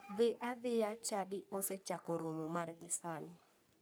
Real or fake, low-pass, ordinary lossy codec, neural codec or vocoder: fake; none; none; codec, 44.1 kHz, 3.4 kbps, Pupu-Codec